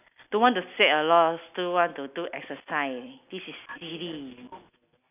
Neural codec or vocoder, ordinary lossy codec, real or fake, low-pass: none; none; real; 3.6 kHz